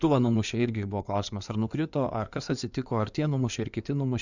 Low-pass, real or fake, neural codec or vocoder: 7.2 kHz; fake; codec, 16 kHz in and 24 kHz out, 2.2 kbps, FireRedTTS-2 codec